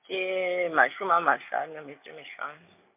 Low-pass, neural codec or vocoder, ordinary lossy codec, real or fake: 3.6 kHz; none; MP3, 32 kbps; real